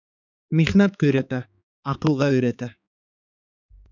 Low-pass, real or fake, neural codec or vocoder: 7.2 kHz; fake; codec, 16 kHz, 4 kbps, X-Codec, HuBERT features, trained on balanced general audio